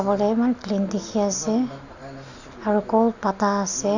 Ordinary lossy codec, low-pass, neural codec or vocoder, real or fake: none; 7.2 kHz; none; real